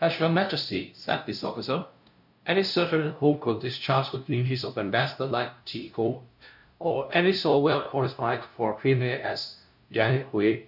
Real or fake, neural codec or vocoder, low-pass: fake; codec, 16 kHz, 0.5 kbps, FunCodec, trained on LibriTTS, 25 frames a second; 5.4 kHz